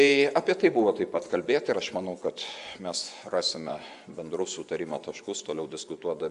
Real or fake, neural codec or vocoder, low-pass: fake; vocoder, 24 kHz, 100 mel bands, Vocos; 10.8 kHz